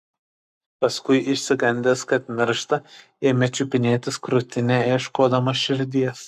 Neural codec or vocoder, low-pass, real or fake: codec, 44.1 kHz, 7.8 kbps, Pupu-Codec; 14.4 kHz; fake